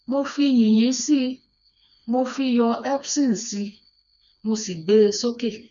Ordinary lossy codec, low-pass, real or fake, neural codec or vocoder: none; 7.2 kHz; fake; codec, 16 kHz, 2 kbps, FreqCodec, smaller model